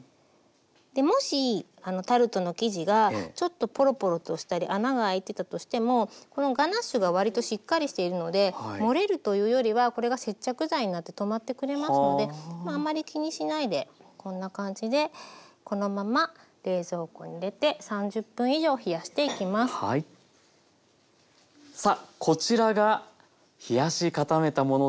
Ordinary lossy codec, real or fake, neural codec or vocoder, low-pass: none; real; none; none